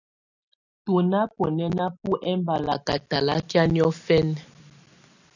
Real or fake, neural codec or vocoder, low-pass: real; none; 7.2 kHz